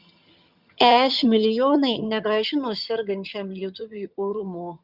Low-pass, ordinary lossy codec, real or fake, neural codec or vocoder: 5.4 kHz; Opus, 64 kbps; fake; vocoder, 22.05 kHz, 80 mel bands, HiFi-GAN